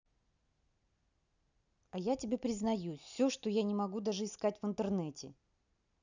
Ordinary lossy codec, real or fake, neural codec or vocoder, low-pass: none; real; none; 7.2 kHz